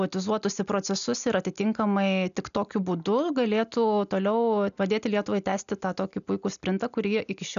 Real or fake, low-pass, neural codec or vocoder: real; 7.2 kHz; none